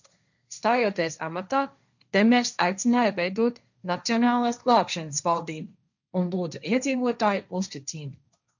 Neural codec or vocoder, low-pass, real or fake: codec, 16 kHz, 1.1 kbps, Voila-Tokenizer; 7.2 kHz; fake